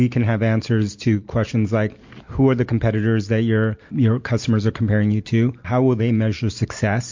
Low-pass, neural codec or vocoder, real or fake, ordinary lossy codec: 7.2 kHz; none; real; MP3, 48 kbps